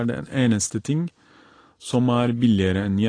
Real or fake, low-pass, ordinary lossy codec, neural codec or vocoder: real; 9.9 kHz; AAC, 32 kbps; none